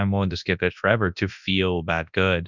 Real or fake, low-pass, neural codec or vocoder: fake; 7.2 kHz; codec, 24 kHz, 0.9 kbps, WavTokenizer, large speech release